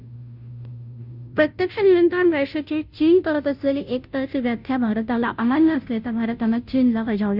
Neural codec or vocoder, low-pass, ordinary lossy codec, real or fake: codec, 16 kHz, 0.5 kbps, FunCodec, trained on Chinese and English, 25 frames a second; 5.4 kHz; none; fake